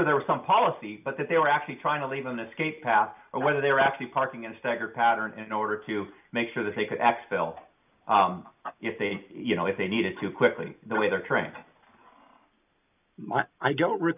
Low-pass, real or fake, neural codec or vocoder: 3.6 kHz; real; none